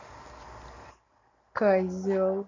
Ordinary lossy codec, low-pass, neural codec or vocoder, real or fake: none; 7.2 kHz; none; real